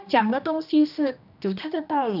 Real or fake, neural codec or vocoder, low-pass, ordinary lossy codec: fake; codec, 16 kHz, 1 kbps, X-Codec, HuBERT features, trained on general audio; 5.4 kHz; none